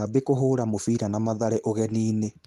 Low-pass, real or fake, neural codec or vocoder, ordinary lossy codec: 14.4 kHz; real; none; Opus, 24 kbps